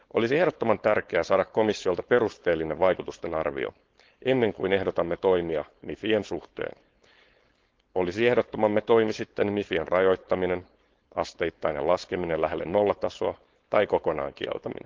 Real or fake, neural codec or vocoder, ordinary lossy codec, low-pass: fake; codec, 16 kHz, 4.8 kbps, FACodec; Opus, 16 kbps; 7.2 kHz